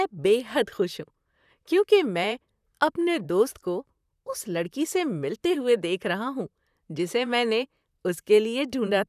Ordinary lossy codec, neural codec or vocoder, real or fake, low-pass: none; vocoder, 44.1 kHz, 128 mel bands, Pupu-Vocoder; fake; 14.4 kHz